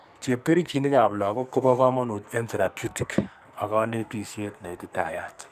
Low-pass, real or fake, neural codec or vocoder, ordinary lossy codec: 14.4 kHz; fake; codec, 44.1 kHz, 2.6 kbps, SNAC; none